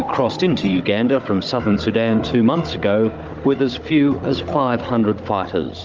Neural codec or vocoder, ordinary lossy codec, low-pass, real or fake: codec, 16 kHz, 16 kbps, FunCodec, trained on Chinese and English, 50 frames a second; Opus, 24 kbps; 7.2 kHz; fake